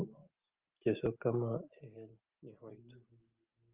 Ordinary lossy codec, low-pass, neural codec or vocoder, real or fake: Opus, 32 kbps; 3.6 kHz; none; real